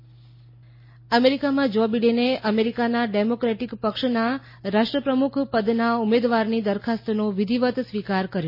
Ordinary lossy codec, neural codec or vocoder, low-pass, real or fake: MP3, 24 kbps; none; 5.4 kHz; real